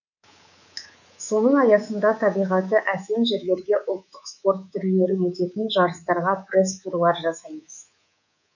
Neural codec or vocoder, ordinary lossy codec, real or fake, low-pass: codec, 24 kHz, 3.1 kbps, DualCodec; none; fake; 7.2 kHz